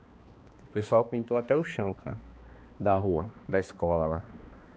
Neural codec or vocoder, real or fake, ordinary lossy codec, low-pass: codec, 16 kHz, 2 kbps, X-Codec, HuBERT features, trained on balanced general audio; fake; none; none